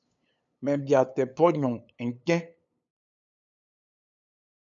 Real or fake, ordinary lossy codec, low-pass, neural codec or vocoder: fake; MP3, 96 kbps; 7.2 kHz; codec, 16 kHz, 8 kbps, FunCodec, trained on LibriTTS, 25 frames a second